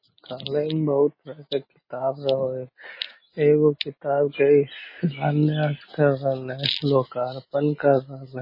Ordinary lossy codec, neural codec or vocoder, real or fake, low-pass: MP3, 24 kbps; none; real; 5.4 kHz